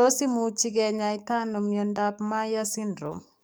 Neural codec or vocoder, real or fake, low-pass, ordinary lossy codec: codec, 44.1 kHz, 7.8 kbps, DAC; fake; none; none